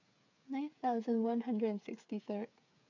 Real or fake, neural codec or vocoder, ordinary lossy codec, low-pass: fake; codec, 16 kHz, 8 kbps, FreqCodec, smaller model; none; 7.2 kHz